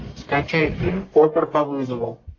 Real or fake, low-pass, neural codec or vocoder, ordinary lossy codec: fake; 7.2 kHz; codec, 44.1 kHz, 1.7 kbps, Pupu-Codec; AAC, 48 kbps